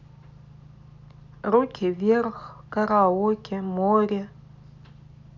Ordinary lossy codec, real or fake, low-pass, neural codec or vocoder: none; real; 7.2 kHz; none